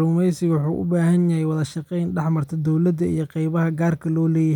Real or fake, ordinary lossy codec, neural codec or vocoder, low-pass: real; none; none; 19.8 kHz